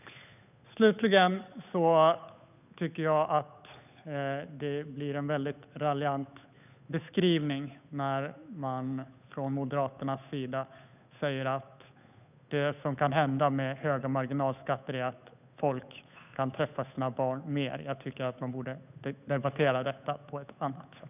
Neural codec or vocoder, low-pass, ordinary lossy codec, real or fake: codec, 16 kHz, 8 kbps, FunCodec, trained on Chinese and English, 25 frames a second; 3.6 kHz; none; fake